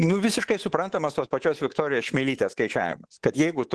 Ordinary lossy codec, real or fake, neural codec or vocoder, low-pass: Opus, 16 kbps; fake; codec, 24 kHz, 3.1 kbps, DualCodec; 10.8 kHz